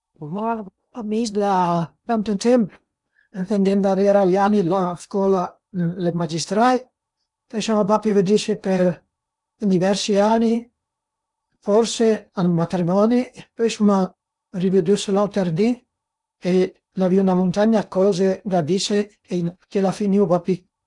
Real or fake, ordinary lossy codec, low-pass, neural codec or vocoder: fake; none; 10.8 kHz; codec, 16 kHz in and 24 kHz out, 0.8 kbps, FocalCodec, streaming, 65536 codes